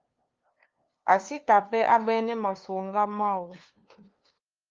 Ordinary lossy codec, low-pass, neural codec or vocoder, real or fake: Opus, 32 kbps; 7.2 kHz; codec, 16 kHz, 2 kbps, FunCodec, trained on LibriTTS, 25 frames a second; fake